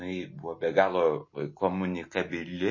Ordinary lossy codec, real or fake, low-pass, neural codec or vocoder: MP3, 32 kbps; real; 7.2 kHz; none